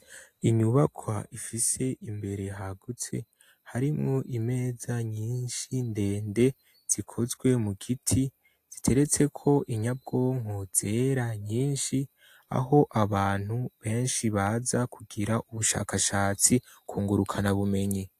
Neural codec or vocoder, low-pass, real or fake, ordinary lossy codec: none; 14.4 kHz; real; AAC, 64 kbps